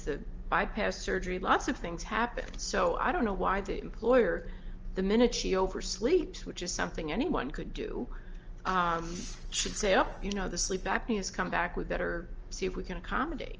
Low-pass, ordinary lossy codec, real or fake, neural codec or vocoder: 7.2 kHz; Opus, 24 kbps; real; none